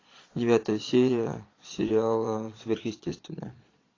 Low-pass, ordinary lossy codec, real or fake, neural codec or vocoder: 7.2 kHz; AAC, 32 kbps; fake; vocoder, 22.05 kHz, 80 mel bands, Vocos